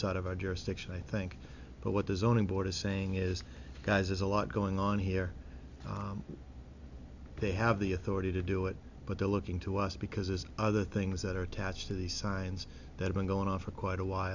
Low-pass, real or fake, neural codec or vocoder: 7.2 kHz; real; none